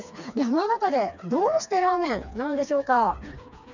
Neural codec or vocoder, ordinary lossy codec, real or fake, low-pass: codec, 16 kHz, 2 kbps, FreqCodec, smaller model; none; fake; 7.2 kHz